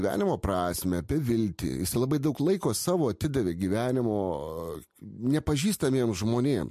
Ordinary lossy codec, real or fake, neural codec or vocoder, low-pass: MP3, 64 kbps; real; none; 14.4 kHz